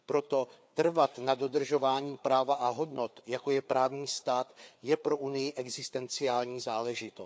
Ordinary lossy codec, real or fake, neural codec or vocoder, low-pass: none; fake; codec, 16 kHz, 4 kbps, FreqCodec, larger model; none